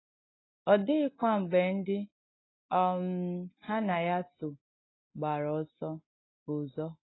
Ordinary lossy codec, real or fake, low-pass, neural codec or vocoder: AAC, 16 kbps; real; 7.2 kHz; none